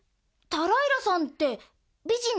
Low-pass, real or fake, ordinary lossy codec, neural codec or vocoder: none; real; none; none